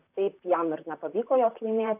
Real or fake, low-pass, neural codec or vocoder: fake; 3.6 kHz; vocoder, 44.1 kHz, 128 mel bands, Pupu-Vocoder